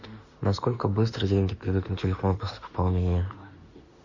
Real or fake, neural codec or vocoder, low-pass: fake; autoencoder, 48 kHz, 32 numbers a frame, DAC-VAE, trained on Japanese speech; 7.2 kHz